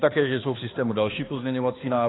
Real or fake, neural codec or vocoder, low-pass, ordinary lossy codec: fake; codec, 16 kHz, 2 kbps, X-Codec, HuBERT features, trained on balanced general audio; 7.2 kHz; AAC, 16 kbps